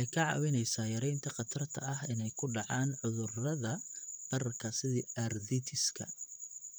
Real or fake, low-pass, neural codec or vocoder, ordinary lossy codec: fake; none; vocoder, 44.1 kHz, 128 mel bands, Pupu-Vocoder; none